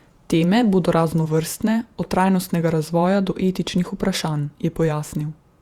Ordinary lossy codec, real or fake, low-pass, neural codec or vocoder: Opus, 64 kbps; fake; 19.8 kHz; vocoder, 48 kHz, 128 mel bands, Vocos